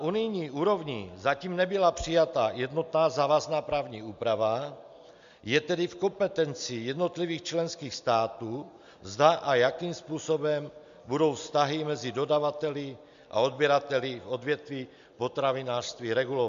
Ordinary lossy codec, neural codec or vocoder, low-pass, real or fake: MP3, 64 kbps; none; 7.2 kHz; real